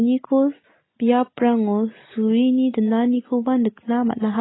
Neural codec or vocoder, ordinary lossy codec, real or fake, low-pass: none; AAC, 16 kbps; real; 7.2 kHz